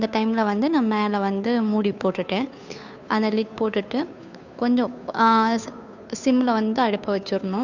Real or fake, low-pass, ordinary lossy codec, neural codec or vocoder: fake; 7.2 kHz; none; codec, 16 kHz, 2 kbps, FunCodec, trained on Chinese and English, 25 frames a second